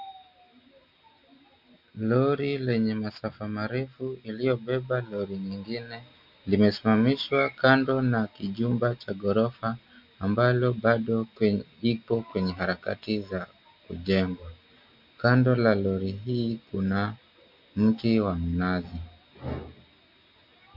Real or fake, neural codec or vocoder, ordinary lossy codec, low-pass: real; none; MP3, 48 kbps; 5.4 kHz